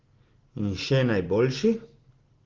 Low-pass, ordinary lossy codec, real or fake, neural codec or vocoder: 7.2 kHz; Opus, 16 kbps; real; none